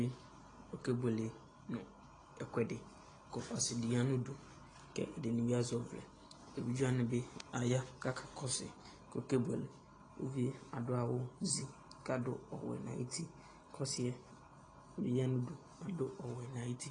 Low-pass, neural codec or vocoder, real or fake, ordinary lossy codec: 9.9 kHz; none; real; AAC, 32 kbps